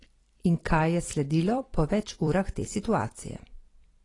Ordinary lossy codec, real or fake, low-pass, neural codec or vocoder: AAC, 32 kbps; fake; 10.8 kHz; vocoder, 44.1 kHz, 128 mel bands every 256 samples, BigVGAN v2